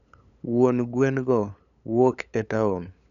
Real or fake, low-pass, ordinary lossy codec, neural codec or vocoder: fake; 7.2 kHz; none; codec, 16 kHz, 8 kbps, FunCodec, trained on LibriTTS, 25 frames a second